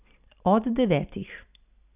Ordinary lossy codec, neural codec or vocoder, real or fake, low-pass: none; none; real; 3.6 kHz